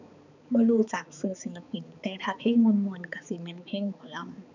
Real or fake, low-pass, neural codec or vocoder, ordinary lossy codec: fake; 7.2 kHz; codec, 16 kHz, 4 kbps, X-Codec, HuBERT features, trained on balanced general audio; none